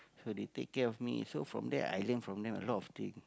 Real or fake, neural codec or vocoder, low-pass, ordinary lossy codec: real; none; none; none